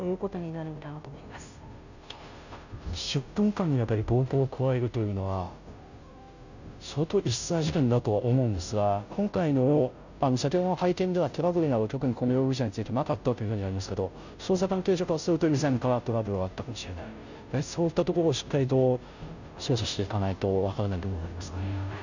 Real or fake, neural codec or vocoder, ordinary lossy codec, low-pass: fake; codec, 16 kHz, 0.5 kbps, FunCodec, trained on Chinese and English, 25 frames a second; none; 7.2 kHz